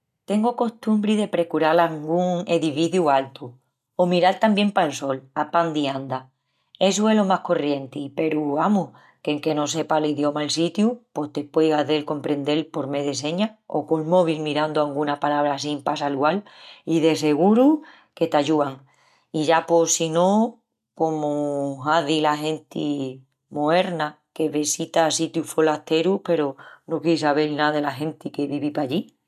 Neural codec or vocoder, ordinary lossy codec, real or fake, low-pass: none; none; real; 14.4 kHz